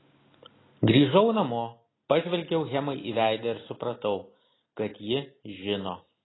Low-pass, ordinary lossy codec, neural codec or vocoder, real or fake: 7.2 kHz; AAC, 16 kbps; none; real